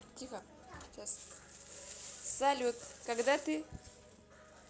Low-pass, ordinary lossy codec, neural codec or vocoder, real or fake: none; none; none; real